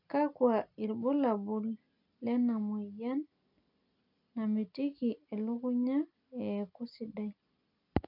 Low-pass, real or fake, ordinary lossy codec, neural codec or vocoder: 5.4 kHz; real; none; none